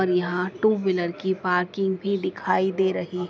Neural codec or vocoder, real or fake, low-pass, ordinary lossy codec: none; real; none; none